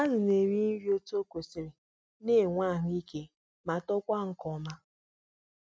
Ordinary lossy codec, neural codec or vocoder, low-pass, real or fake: none; none; none; real